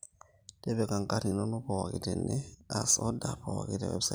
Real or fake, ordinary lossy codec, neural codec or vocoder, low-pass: real; none; none; none